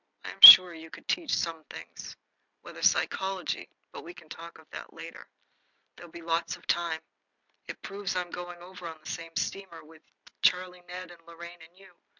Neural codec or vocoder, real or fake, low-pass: none; real; 7.2 kHz